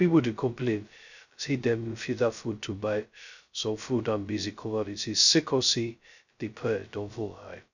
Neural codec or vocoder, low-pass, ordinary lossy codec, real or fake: codec, 16 kHz, 0.2 kbps, FocalCodec; 7.2 kHz; none; fake